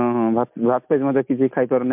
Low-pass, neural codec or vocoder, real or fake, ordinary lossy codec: 3.6 kHz; none; real; MP3, 32 kbps